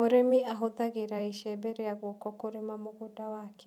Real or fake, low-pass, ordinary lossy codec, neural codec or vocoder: fake; 19.8 kHz; none; vocoder, 44.1 kHz, 128 mel bands every 512 samples, BigVGAN v2